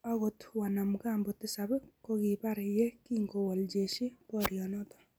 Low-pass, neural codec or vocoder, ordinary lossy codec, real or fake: none; none; none; real